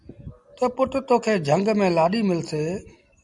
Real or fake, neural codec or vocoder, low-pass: real; none; 10.8 kHz